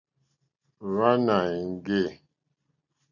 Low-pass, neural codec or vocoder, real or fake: 7.2 kHz; none; real